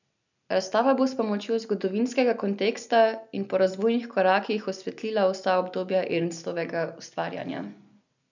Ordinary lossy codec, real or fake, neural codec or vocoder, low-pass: none; real; none; 7.2 kHz